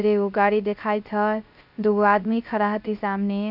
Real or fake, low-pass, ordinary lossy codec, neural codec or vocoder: fake; 5.4 kHz; none; codec, 16 kHz, 0.3 kbps, FocalCodec